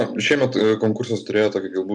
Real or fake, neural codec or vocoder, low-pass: real; none; 10.8 kHz